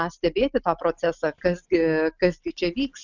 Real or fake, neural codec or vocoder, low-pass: real; none; 7.2 kHz